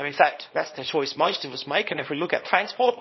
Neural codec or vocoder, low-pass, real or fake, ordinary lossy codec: codec, 24 kHz, 0.9 kbps, WavTokenizer, small release; 7.2 kHz; fake; MP3, 24 kbps